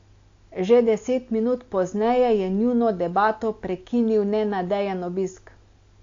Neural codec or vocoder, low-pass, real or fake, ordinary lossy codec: none; 7.2 kHz; real; AAC, 48 kbps